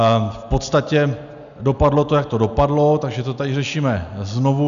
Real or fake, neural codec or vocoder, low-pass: real; none; 7.2 kHz